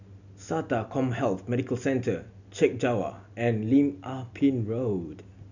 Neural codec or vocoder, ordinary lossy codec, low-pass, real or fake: none; none; 7.2 kHz; real